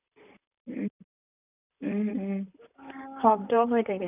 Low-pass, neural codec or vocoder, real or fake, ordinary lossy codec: 3.6 kHz; vocoder, 44.1 kHz, 128 mel bands, Pupu-Vocoder; fake; none